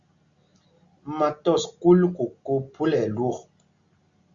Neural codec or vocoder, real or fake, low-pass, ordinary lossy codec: none; real; 7.2 kHz; Opus, 64 kbps